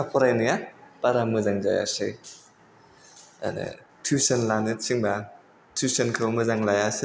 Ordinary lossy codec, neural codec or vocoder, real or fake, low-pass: none; none; real; none